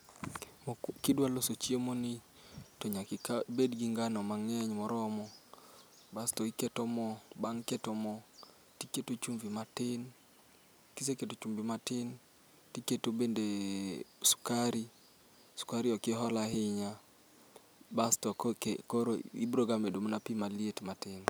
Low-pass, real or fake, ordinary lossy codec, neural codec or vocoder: none; real; none; none